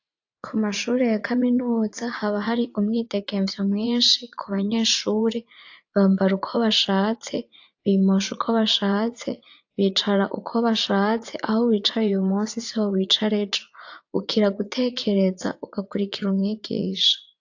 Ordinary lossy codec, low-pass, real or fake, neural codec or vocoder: AAC, 48 kbps; 7.2 kHz; fake; vocoder, 44.1 kHz, 80 mel bands, Vocos